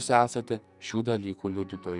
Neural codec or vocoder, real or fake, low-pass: codec, 44.1 kHz, 2.6 kbps, SNAC; fake; 10.8 kHz